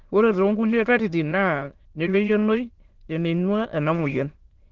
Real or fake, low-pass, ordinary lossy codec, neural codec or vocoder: fake; 7.2 kHz; Opus, 16 kbps; autoencoder, 22.05 kHz, a latent of 192 numbers a frame, VITS, trained on many speakers